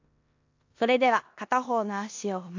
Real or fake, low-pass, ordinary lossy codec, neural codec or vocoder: fake; 7.2 kHz; AAC, 48 kbps; codec, 16 kHz in and 24 kHz out, 0.9 kbps, LongCat-Audio-Codec, four codebook decoder